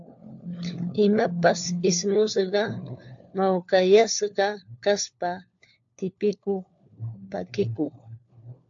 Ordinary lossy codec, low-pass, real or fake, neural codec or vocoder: AAC, 64 kbps; 7.2 kHz; fake; codec, 16 kHz, 4 kbps, FunCodec, trained on LibriTTS, 50 frames a second